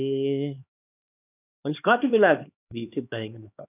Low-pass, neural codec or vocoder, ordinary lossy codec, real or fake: 3.6 kHz; codec, 16 kHz, 2 kbps, X-Codec, HuBERT features, trained on LibriSpeech; none; fake